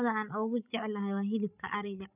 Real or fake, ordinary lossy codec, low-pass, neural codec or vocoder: fake; none; 3.6 kHz; codec, 16 kHz, 16 kbps, FreqCodec, larger model